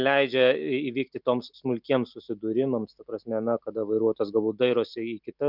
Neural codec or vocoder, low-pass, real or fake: none; 5.4 kHz; real